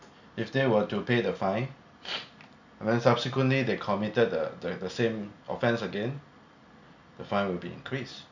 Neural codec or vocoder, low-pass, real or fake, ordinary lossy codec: none; 7.2 kHz; real; none